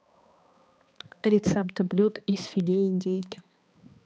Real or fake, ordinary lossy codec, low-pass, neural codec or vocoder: fake; none; none; codec, 16 kHz, 2 kbps, X-Codec, HuBERT features, trained on balanced general audio